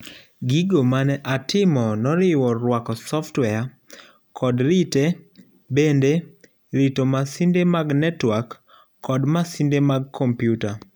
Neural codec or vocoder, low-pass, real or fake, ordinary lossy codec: none; none; real; none